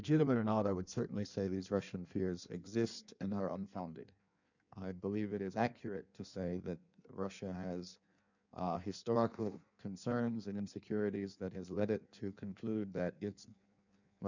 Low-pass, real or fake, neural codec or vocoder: 7.2 kHz; fake; codec, 16 kHz in and 24 kHz out, 1.1 kbps, FireRedTTS-2 codec